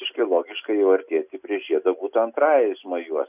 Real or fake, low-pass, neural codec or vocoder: real; 3.6 kHz; none